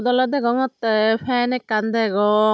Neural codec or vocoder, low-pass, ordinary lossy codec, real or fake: none; none; none; real